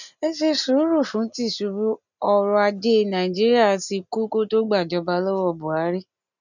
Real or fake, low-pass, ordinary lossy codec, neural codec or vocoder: real; 7.2 kHz; none; none